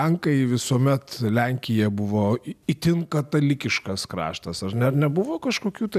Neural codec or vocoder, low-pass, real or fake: none; 14.4 kHz; real